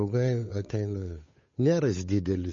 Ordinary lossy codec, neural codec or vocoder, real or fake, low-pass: MP3, 32 kbps; codec, 16 kHz, 8 kbps, FunCodec, trained on Chinese and English, 25 frames a second; fake; 7.2 kHz